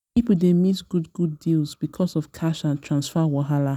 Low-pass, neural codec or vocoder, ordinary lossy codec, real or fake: 19.8 kHz; none; none; real